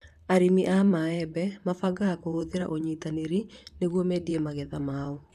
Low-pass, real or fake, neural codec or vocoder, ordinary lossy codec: 14.4 kHz; fake; vocoder, 44.1 kHz, 128 mel bands, Pupu-Vocoder; none